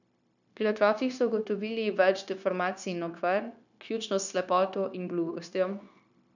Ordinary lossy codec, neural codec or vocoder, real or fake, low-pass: none; codec, 16 kHz, 0.9 kbps, LongCat-Audio-Codec; fake; 7.2 kHz